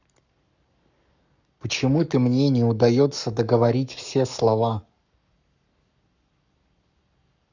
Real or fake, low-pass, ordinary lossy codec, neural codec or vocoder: fake; 7.2 kHz; none; codec, 44.1 kHz, 7.8 kbps, Pupu-Codec